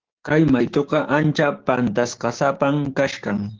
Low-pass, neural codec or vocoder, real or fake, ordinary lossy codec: 7.2 kHz; codec, 16 kHz, 6 kbps, DAC; fake; Opus, 16 kbps